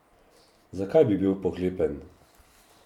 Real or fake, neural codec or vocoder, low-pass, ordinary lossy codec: fake; vocoder, 44.1 kHz, 128 mel bands every 512 samples, BigVGAN v2; 19.8 kHz; MP3, 96 kbps